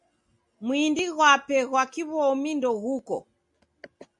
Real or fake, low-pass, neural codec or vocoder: real; 10.8 kHz; none